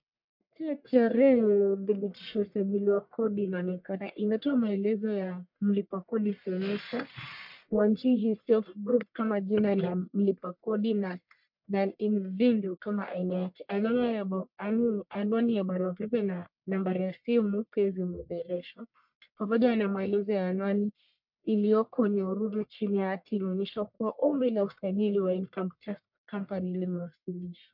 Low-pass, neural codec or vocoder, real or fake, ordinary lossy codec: 5.4 kHz; codec, 44.1 kHz, 1.7 kbps, Pupu-Codec; fake; MP3, 48 kbps